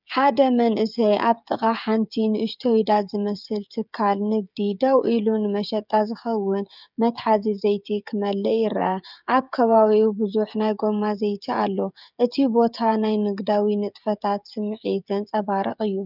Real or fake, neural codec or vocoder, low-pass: fake; codec, 16 kHz, 16 kbps, FreqCodec, smaller model; 5.4 kHz